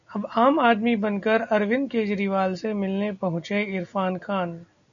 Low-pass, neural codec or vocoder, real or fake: 7.2 kHz; none; real